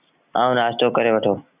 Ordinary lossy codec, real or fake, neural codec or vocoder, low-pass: Opus, 64 kbps; real; none; 3.6 kHz